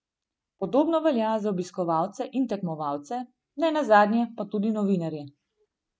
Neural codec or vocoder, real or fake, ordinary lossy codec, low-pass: none; real; none; none